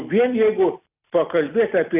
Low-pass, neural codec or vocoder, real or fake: 3.6 kHz; none; real